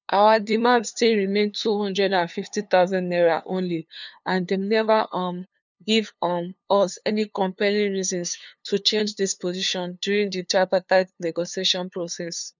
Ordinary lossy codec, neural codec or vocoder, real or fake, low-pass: none; codec, 16 kHz, 2 kbps, FunCodec, trained on LibriTTS, 25 frames a second; fake; 7.2 kHz